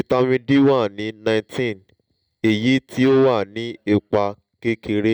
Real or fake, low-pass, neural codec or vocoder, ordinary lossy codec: real; 19.8 kHz; none; none